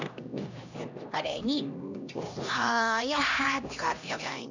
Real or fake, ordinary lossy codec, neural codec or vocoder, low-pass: fake; none; codec, 16 kHz, 1 kbps, X-Codec, HuBERT features, trained on LibriSpeech; 7.2 kHz